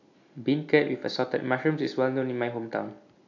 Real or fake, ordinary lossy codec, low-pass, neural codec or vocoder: real; AAC, 48 kbps; 7.2 kHz; none